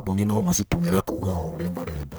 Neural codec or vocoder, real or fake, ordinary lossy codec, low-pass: codec, 44.1 kHz, 1.7 kbps, Pupu-Codec; fake; none; none